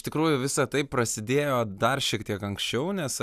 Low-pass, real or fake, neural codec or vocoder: 14.4 kHz; real; none